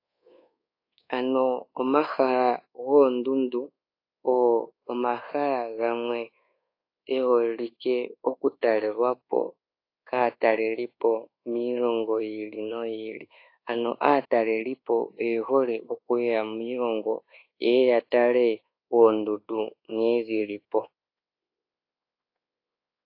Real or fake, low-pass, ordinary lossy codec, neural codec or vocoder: fake; 5.4 kHz; AAC, 32 kbps; codec, 24 kHz, 1.2 kbps, DualCodec